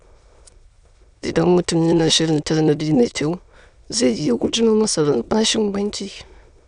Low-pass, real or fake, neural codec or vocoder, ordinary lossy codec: 9.9 kHz; fake; autoencoder, 22.05 kHz, a latent of 192 numbers a frame, VITS, trained on many speakers; none